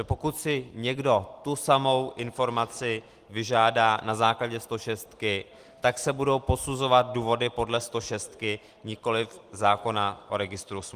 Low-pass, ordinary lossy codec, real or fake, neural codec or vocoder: 14.4 kHz; Opus, 24 kbps; real; none